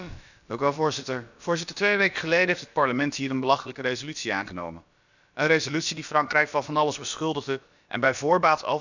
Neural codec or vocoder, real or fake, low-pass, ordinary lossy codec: codec, 16 kHz, about 1 kbps, DyCAST, with the encoder's durations; fake; 7.2 kHz; none